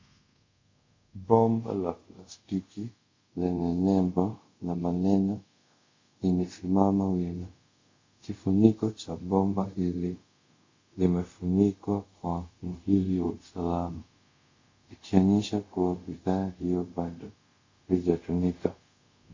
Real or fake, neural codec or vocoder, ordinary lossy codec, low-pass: fake; codec, 24 kHz, 0.5 kbps, DualCodec; MP3, 48 kbps; 7.2 kHz